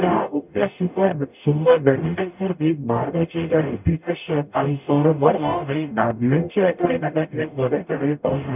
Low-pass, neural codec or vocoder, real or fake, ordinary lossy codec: 3.6 kHz; codec, 44.1 kHz, 0.9 kbps, DAC; fake; none